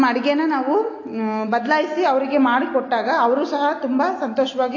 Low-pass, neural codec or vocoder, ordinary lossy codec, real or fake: 7.2 kHz; none; AAC, 32 kbps; real